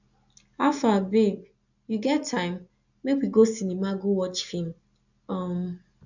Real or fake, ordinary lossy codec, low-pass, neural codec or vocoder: real; none; 7.2 kHz; none